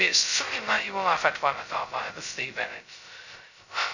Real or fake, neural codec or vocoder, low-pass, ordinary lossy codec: fake; codec, 16 kHz, 0.2 kbps, FocalCodec; 7.2 kHz; none